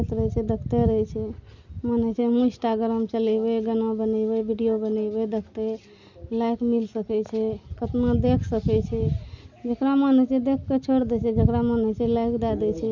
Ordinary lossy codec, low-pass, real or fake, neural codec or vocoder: none; 7.2 kHz; real; none